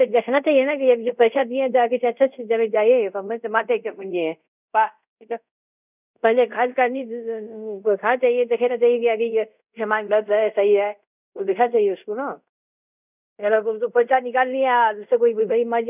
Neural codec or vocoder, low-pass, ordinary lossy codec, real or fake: codec, 24 kHz, 0.5 kbps, DualCodec; 3.6 kHz; none; fake